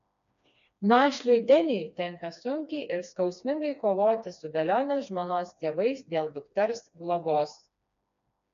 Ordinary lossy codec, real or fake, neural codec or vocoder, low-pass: AAC, 96 kbps; fake; codec, 16 kHz, 2 kbps, FreqCodec, smaller model; 7.2 kHz